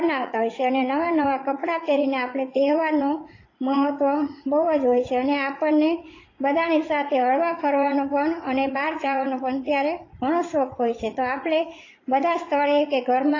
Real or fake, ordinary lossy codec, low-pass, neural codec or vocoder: fake; AAC, 32 kbps; 7.2 kHz; vocoder, 44.1 kHz, 80 mel bands, Vocos